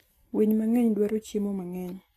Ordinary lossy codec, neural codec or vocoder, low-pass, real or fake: AAC, 48 kbps; none; 14.4 kHz; real